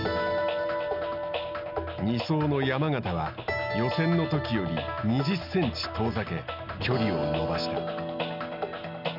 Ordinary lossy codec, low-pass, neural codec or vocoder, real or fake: none; 5.4 kHz; none; real